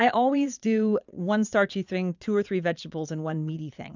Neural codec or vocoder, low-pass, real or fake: vocoder, 22.05 kHz, 80 mel bands, Vocos; 7.2 kHz; fake